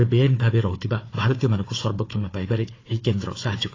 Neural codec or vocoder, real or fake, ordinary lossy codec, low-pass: codec, 16 kHz, 4 kbps, FunCodec, trained on Chinese and English, 50 frames a second; fake; AAC, 32 kbps; 7.2 kHz